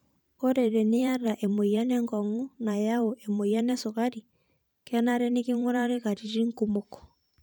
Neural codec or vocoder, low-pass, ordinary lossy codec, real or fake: vocoder, 44.1 kHz, 128 mel bands every 512 samples, BigVGAN v2; none; none; fake